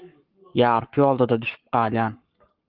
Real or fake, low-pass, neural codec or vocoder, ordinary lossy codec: fake; 5.4 kHz; codec, 44.1 kHz, 7.8 kbps, Pupu-Codec; Opus, 32 kbps